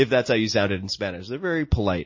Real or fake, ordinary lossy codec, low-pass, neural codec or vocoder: real; MP3, 32 kbps; 7.2 kHz; none